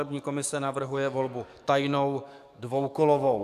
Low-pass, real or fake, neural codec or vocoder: 14.4 kHz; fake; codec, 44.1 kHz, 7.8 kbps, DAC